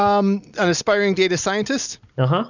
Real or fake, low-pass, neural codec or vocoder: real; 7.2 kHz; none